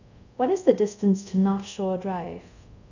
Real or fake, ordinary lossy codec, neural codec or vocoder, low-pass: fake; none; codec, 24 kHz, 0.5 kbps, DualCodec; 7.2 kHz